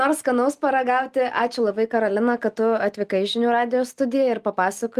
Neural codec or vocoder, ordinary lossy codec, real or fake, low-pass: none; Opus, 32 kbps; real; 14.4 kHz